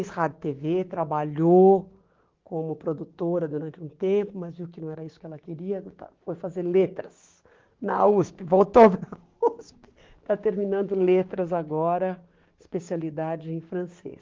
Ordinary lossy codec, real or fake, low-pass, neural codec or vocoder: Opus, 16 kbps; fake; 7.2 kHz; codec, 16 kHz, 6 kbps, DAC